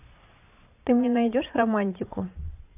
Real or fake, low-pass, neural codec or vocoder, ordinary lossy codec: fake; 3.6 kHz; vocoder, 22.05 kHz, 80 mel bands, Vocos; AAC, 32 kbps